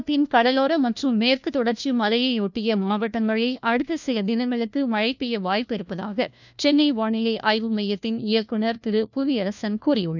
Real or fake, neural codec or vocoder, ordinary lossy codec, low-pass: fake; codec, 16 kHz, 1 kbps, FunCodec, trained on LibriTTS, 50 frames a second; none; 7.2 kHz